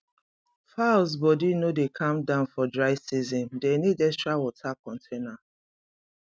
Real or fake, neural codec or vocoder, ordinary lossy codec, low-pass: real; none; none; none